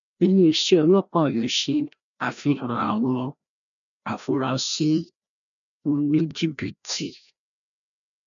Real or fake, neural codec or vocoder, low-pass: fake; codec, 16 kHz, 1 kbps, FreqCodec, larger model; 7.2 kHz